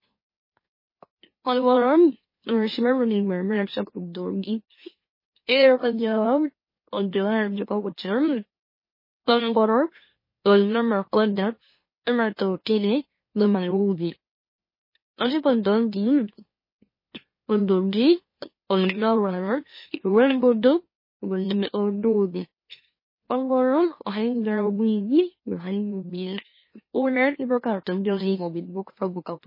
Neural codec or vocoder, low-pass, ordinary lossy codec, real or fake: autoencoder, 44.1 kHz, a latent of 192 numbers a frame, MeloTTS; 5.4 kHz; MP3, 24 kbps; fake